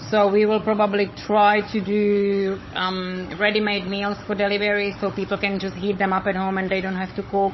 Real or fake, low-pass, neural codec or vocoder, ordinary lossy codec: fake; 7.2 kHz; codec, 16 kHz, 16 kbps, FunCodec, trained on Chinese and English, 50 frames a second; MP3, 24 kbps